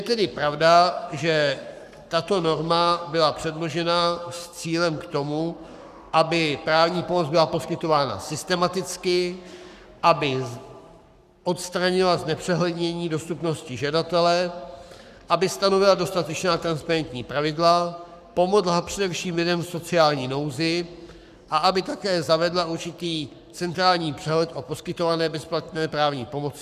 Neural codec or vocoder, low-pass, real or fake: codec, 44.1 kHz, 7.8 kbps, Pupu-Codec; 14.4 kHz; fake